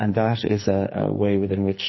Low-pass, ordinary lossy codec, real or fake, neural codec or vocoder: 7.2 kHz; MP3, 24 kbps; fake; codec, 44.1 kHz, 2.6 kbps, SNAC